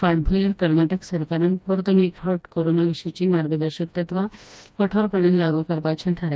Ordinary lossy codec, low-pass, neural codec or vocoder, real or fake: none; none; codec, 16 kHz, 1 kbps, FreqCodec, smaller model; fake